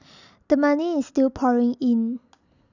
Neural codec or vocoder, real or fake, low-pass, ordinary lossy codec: none; real; 7.2 kHz; none